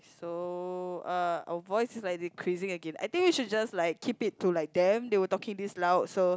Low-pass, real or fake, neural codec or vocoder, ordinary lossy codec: none; real; none; none